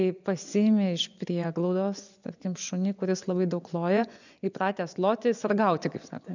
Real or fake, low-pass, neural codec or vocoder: fake; 7.2 kHz; vocoder, 24 kHz, 100 mel bands, Vocos